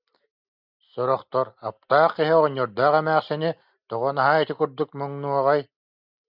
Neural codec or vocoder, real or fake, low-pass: none; real; 5.4 kHz